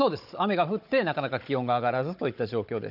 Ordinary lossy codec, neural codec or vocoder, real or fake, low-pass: none; codec, 16 kHz, 16 kbps, FunCodec, trained on Chinese and English, 50 frames a second; fake; 5.4 kHz